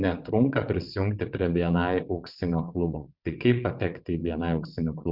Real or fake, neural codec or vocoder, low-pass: fake; vocoder, 22.05 kHz, 80 mel bands, WaveNeXt; 5.4 kHz